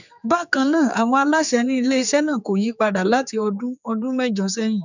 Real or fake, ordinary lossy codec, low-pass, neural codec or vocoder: fake; none; 7.2 kHz; codec, 16 kHz, 4 kbps, X-Codec, HuBERT features, trained on general audio